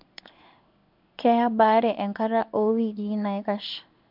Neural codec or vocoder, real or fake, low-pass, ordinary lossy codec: codec, 16 kHz in and 24 kHz out, 1 kbps, XY-Tokenizer; fake; 5.4 kHz; MP3, 48 kbps